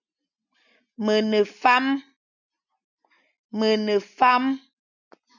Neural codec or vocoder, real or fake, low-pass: none; real; 7.2 kHz